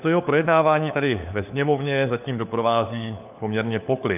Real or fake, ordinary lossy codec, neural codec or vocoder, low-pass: fake; AAC, 32 kbps; codec, 16 kHz, 4 kbps, FunCodec, trained on LibriTTS, 50 frames a second; 3.6 kHz